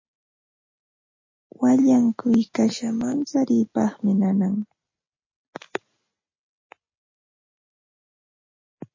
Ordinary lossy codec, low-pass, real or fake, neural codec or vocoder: MP3, 32 kbps; 7.2 kHz; real; none